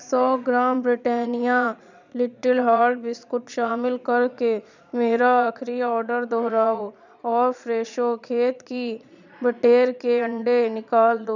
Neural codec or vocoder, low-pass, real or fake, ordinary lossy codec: vocoder, 22.05 kHz, 80 mel bands, Vocos; 7.2 kHz; fake; none